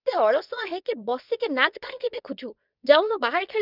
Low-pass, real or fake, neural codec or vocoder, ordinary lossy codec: 5.4 kHz; fake; codec, 24 kHz, 0.9 kbps, WavTokenizer, medium speech release version 2; none